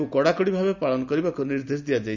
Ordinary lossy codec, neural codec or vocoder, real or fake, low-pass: none; none; real; 7.2 kHz